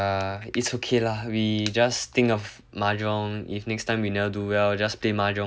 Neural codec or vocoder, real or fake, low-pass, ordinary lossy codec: none; real; none; none